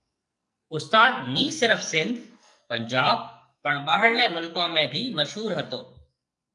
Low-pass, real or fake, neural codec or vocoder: 10.8 kHz; fake; codec, 44.1 kHz, 2.6 kbps, SNAC